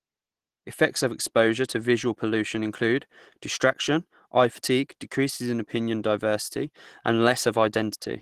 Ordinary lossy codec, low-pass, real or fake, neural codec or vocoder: Opus, 16 kbps; 14.4 kHz; real; none